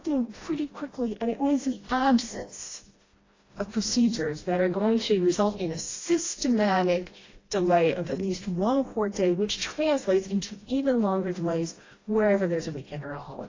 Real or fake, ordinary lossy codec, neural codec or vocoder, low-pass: fake; AAC, 32 kbps; codec, 16 kHz, 1 kbps, FreqCodec, smaller model; 7.2 kHz